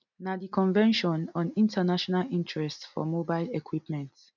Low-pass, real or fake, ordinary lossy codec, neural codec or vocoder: 7.2 kHz; real; none; none